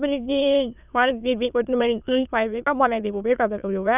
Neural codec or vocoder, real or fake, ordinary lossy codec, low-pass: autoencoder, 22.05 kHz, a latent of 192 numbers a frame, VITS, trained on many speakers; fake; none; 3.6 kHz